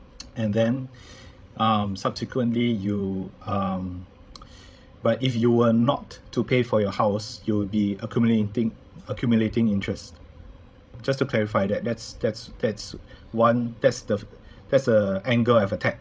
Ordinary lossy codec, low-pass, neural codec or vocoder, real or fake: none; none; codec, 16 kHz, 16 kbps, FreqCodec, larger model; fake